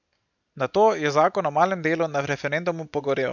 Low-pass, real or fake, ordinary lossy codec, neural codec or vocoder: 7.2 kHz; real; none; none